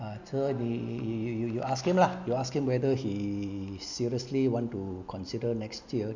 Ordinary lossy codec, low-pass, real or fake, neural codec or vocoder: none; 7.2 kHz; real; none